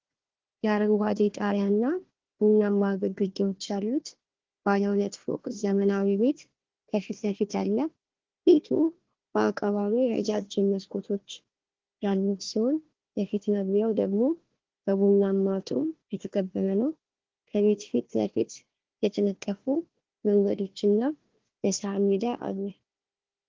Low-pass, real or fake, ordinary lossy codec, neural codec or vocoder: 7.2 kHz; fake; Opus, 16 kbps; codec, 16 kHz, 1 kbps, FunCodec, trained on Chinese and English, 50 frames a second